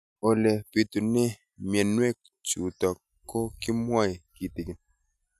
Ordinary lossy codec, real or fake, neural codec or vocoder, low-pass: none; real; none; 14.4 kHz